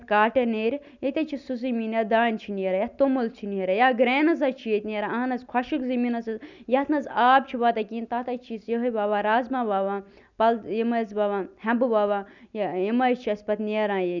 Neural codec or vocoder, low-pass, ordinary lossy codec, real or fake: none; 7.2 kHz; none; real